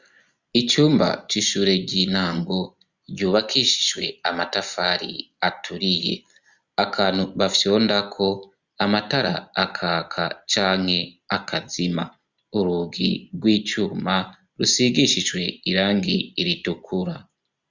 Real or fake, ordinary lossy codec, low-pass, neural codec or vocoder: real; Opus, 64 kbps; 7.2 kHz; none